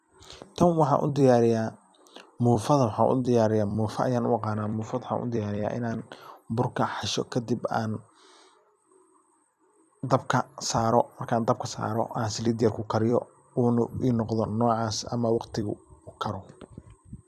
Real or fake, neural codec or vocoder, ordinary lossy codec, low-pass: fake; vocoder, 44.1 kHz, 128 mel bands every 256 samples, BigVGAN v2; none; 14.4 kHz